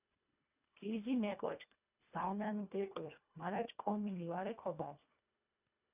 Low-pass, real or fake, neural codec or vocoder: 3.6 kHz; fake; codec, 24 kHz, 1.5 kbps, HILCodec